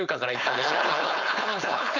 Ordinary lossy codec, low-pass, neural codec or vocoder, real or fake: none; 7.2 kHz; codec, 16 kHz, 4.8 kbps, FACodec; fake